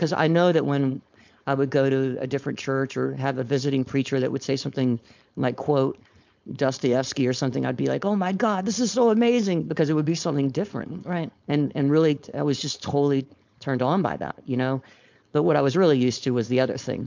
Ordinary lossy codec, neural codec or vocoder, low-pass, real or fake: MP3, 64 kbps; codec, 16 kHz, 4.8 kbps, FACodec; 7.2 kHz; fake